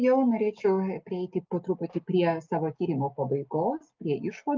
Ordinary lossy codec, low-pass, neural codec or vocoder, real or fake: Opus, 24 kbps; 7.2 kHz; none; real